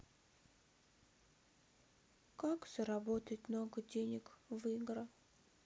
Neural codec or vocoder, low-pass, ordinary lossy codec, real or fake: none; none; none; real